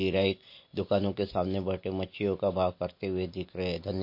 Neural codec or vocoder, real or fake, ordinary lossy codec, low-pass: none; real; MP3, 32 kbps; 5.4 kHz